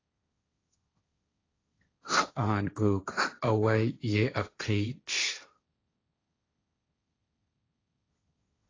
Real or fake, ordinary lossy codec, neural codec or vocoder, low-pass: fake; AAC, 32 kbps; codec, 16 kHz, 1.1 kbps, Voila-Tokenizer; 7.2 kHz